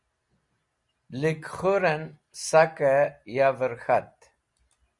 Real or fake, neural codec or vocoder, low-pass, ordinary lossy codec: real; none; 10.8 kHz; Opus, 64 kbps